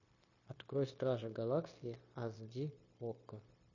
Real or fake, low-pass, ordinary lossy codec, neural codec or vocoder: fake; 7.2 kHz; MP3, 32 kbps; codec, 16 kHz, 0.9 kbps, LongCat-Audio-Codec